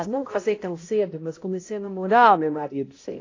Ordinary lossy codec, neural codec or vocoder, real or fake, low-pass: AAC, 32 kbps; codec, 16 kHz, 0.5 kbps, X-Codec, HuBERT features, trained on balanced general audio; fake; 7.2 kHz